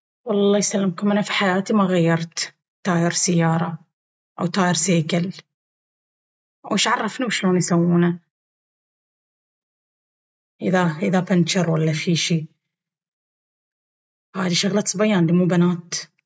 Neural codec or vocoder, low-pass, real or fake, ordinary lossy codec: none; none; real; none